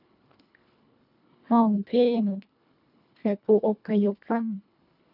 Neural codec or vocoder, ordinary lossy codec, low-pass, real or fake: codec, 24 kHz, 1.5 kbps, HILCodec; none; 5.4 kHz; fake